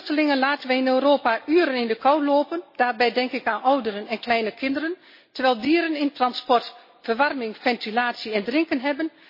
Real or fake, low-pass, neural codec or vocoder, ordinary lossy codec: real; 5.4 kHz; none; MP3, 24 kbps